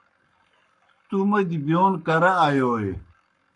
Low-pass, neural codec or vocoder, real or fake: 10.8 kHz; codec, 44.1 kHz, 7.8 kbps, Pupu-Codec; fake